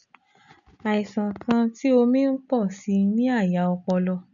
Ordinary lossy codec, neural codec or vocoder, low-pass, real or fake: none; none; 7.2 kHz; real